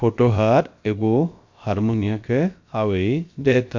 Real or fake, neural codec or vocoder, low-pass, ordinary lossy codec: fake; codec, 16 kHz, about 1 kbps, DyCAST, with the encoder's durations; 7.2 kHz; MP3, 48 kbps